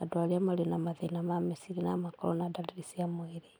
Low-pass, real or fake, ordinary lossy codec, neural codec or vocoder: none; real; none; none